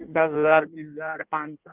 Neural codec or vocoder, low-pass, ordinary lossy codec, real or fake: codec, 16 kHz in and 24 kHz out, 1.1 kbps, FireRedTTS-2 codec; 3.6 kHz; Opus, 32 kbps; fake